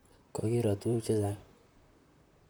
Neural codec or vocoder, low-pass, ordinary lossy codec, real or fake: vocoder, 44.1 kHz, 128 mel bands, Pupu-Vocoder; none; none; fake